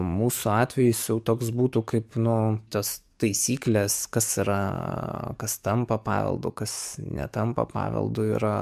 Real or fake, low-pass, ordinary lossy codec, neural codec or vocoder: fake; 14.4 kHz; MP3, 96 kbps; codec, 44.1 kHz, 7.8 kbps, DAC